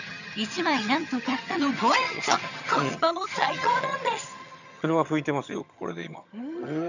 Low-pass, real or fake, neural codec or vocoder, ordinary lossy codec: 7.2 kHz; fake; vocoder, 22.05 kHz, 80 mel bands, HiFi-GAN; none